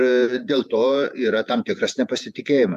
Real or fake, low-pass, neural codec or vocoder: fake; 14.4 kHz; vocoder, 48 kHz, 128 mel bands, Vocos